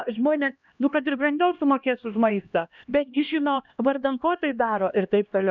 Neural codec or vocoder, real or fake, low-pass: codec, 16 kHz, 1 kbps, X-Codec, HuBERT features, trained on LibriSpeech; fake; 7.2 kHz